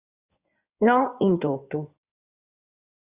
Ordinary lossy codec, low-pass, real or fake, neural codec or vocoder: Opus, 64 kbps; 3.6 kHz; fake; codec, 16 kHz in and 24 kHz out, 2.2 kbps, FireRedTTS-2 codec